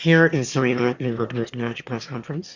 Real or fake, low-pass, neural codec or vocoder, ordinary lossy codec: fake; 7.2 kHz; autoencoder, 22.05 kHz, a latent of 192 numbers a frame, VITS, trained on one speaker; Opus, 64 kbps